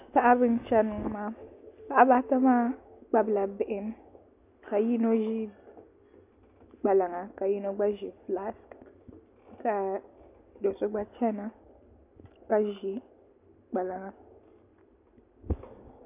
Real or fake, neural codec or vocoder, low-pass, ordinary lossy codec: real; none; 3.6 kHz; AAC, 32 kbps